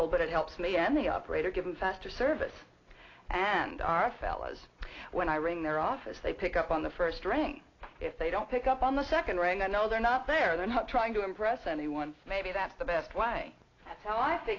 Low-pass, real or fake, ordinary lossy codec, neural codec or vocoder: 7.2 kHz; real; AAC, 32 kbps; none